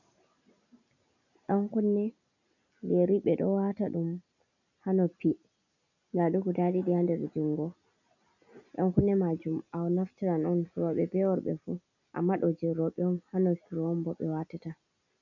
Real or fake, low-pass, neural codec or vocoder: real; 7.2 kHz; none